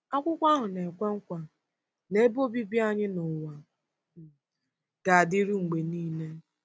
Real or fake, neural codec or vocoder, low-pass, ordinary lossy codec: real; none; none; none